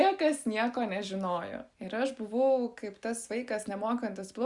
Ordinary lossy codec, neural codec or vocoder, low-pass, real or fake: Opus, 64 kbps; none; 10.8 kHz; real